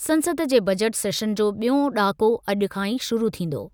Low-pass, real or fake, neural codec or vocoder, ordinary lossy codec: none; real; none; none